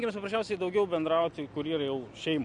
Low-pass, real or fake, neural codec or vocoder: 9.9 kHz; real; none